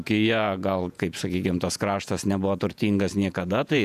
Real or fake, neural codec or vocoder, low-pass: fake; vocoder, 48 kHz, 128 mel bands, Vocos; 14.4 kHz